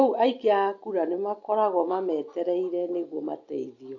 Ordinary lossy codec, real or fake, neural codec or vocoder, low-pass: AAC, 48 kbps; real; none; 7.2 kHz